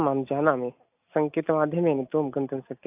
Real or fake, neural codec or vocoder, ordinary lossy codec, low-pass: real; none; AAC, 32 kbps; 3.6 kHz